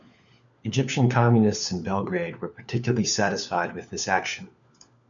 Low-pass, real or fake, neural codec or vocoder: 7.2 kHz; fake; codec, 16 kHz, 4 kbps, FunCodec, trained on LibriTTS, 50 frames a second